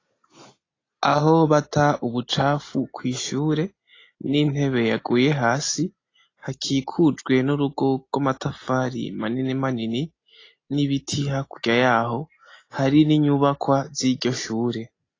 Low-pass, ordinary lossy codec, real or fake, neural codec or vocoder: 7.2 kHz; AAC, 32 kbps; real; none